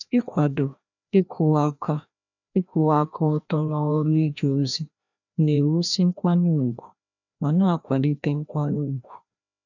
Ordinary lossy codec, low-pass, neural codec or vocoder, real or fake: none; 7.2 kHz; codec, 16 kHz, 1 kbps, FreqCodec, larger model; fake